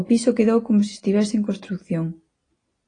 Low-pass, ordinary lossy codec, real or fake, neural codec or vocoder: 9.9 kHz; AAC, 32 kbps; real; none